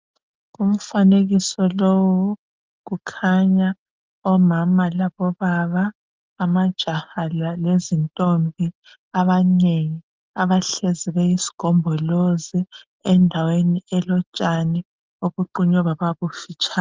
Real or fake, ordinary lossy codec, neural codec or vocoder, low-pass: real; Opus, 24 kbps; none; 7.2 kHz